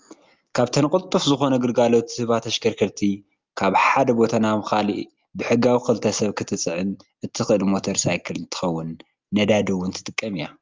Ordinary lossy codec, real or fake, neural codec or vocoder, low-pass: Opus, 32 kbps; real; none; 7.2 kHz